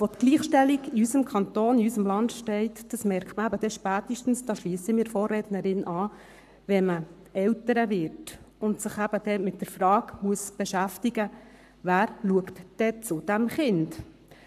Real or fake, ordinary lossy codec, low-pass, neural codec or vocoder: fake; none; 14.4 kHz; codec, 44.1 kHz, 7.8 kbps, Pupu-Codec